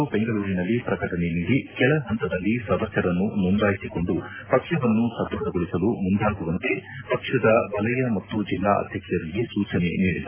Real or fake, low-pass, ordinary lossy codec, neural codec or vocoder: real; 3.6 kHz; AAC, 24 kbps; none